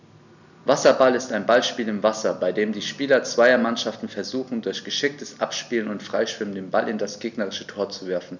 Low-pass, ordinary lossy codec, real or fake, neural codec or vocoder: 7.2 kHz; none; real; none